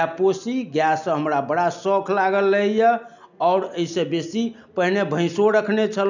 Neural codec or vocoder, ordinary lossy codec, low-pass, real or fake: none; none; 7.2 kHz; real